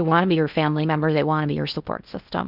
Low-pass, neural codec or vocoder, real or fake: 5.4 kHz; codec, 16 kHz in and 24 kHz out, 0.6 kbps, FocalCodec, streaming, 4096 codes; fake